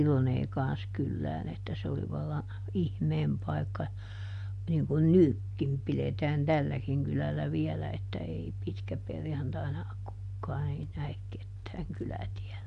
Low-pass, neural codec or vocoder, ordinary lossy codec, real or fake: 10.8 kHz; none; MP3, 64 kbps; real